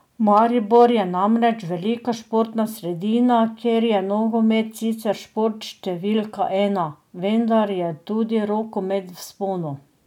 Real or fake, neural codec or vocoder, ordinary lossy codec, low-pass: real; none; none; 19.8 kHz